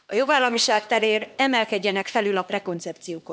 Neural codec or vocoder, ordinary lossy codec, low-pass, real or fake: codec, 16 kHz, 2 kbps, X-Codec, HuBERT features, trained on LibriSpeech; none; none; fake